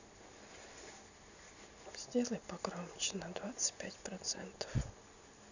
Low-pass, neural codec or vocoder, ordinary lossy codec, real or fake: 7.2 kHz; none; none; real